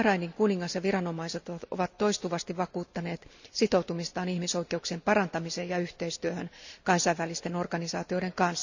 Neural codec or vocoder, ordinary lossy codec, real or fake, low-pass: none; none; real; 7.2 kHz